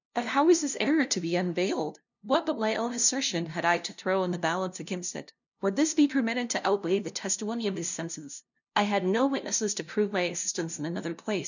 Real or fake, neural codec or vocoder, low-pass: fake; codec, 16 kHz, 0.5 kbps, FunCodec, trained on LibriTTS, 25 frames a second; 7.2 kHz